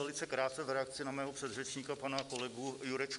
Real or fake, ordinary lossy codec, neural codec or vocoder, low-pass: real; MP3, 96 kbps; none; 10.8 kHz